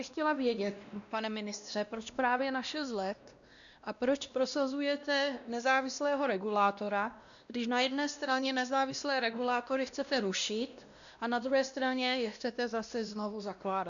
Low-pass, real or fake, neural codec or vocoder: 7.2 kHz; fake; codec, 16 kHz, 1 kbps, X-Codec, WavLM features, trained on Multilingual LibriSpeech